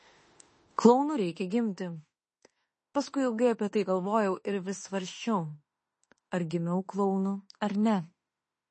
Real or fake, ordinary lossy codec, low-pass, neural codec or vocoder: fake; MP3, 32 kbps; 10.8 kHz; autoencoder, 48 kHz, 32 numbers a frame, DAC-VAE, trained on Japanese speech